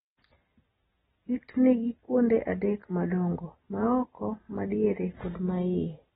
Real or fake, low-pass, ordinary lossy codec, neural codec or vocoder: real; 14.4 kHz; AAC, 16 kbps; none